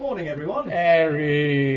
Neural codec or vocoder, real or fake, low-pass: none; real; 7.2 kHz